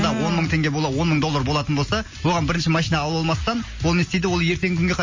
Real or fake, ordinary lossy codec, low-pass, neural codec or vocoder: real; MP3, 32 kbps; 7.2 kHz; none